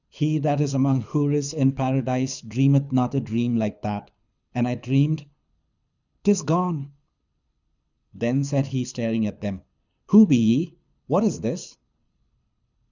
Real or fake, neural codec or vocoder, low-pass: fake; codec, 24 kHz, 6 kbps, HILCodec; 7.2 kHz